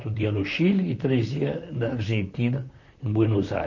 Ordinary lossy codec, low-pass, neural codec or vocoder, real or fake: none; 7.2 kHz; vocoder, 44.1 kHz, 128 mel bands, Pupu-Vocoder; fake